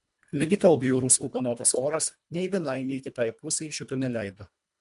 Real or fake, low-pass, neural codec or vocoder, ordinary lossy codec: fake; 10.8 kHz; codec, 24 kHz, 1.5 kbps, HILCodec; MP3, 64 kbps